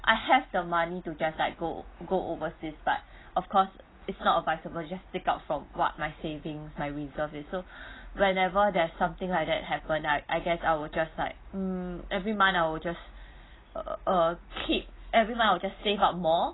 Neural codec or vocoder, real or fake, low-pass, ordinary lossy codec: none; real; 7.2 kHz; AAC, 16 kbps